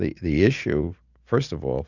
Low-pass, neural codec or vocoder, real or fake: 7.2 kHz; none; real